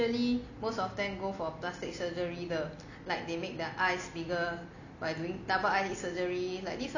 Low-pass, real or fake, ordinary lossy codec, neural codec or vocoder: 7.2 kHz; real; none; none